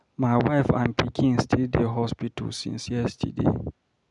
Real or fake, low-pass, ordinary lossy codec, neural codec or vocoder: real; 10.8 kHz; none; none